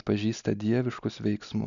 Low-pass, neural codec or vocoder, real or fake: 7.2 kHz; none; real